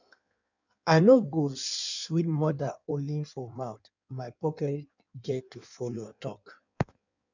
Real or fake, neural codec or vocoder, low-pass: fake; codec, 16 kHz in and 24 kHz out, 1.1 kbps, FireRedTTS-2 codec; 7.2 kHz